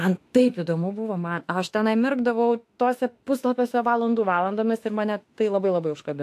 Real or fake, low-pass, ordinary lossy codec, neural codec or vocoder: fake; 14.4 kHz; AAC, 64 kbps; autoencoder, 48 kHz, 32 numbers a frame, DAC-VAE, trained on Japanese speech